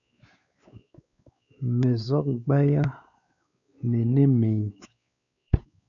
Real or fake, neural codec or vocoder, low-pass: fake; codec, 16 kHz, 4 kbps, X-Codec, WavLM features, trained on Multilingual LibriSpeech; 7.2 kHz